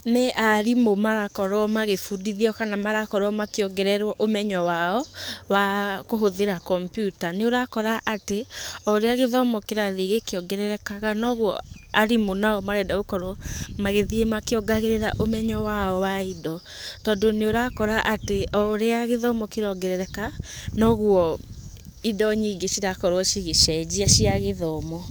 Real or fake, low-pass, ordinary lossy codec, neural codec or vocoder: fake; none; none; codec, 44.1 kHz, 7.8 kbps, DAC